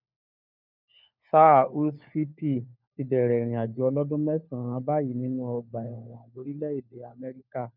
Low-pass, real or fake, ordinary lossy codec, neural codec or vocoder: 5.4 kHz; fake; none; codec, 16 kHz, 4 kbps, FunCodec, trained on LibriTTS, 50 frames a second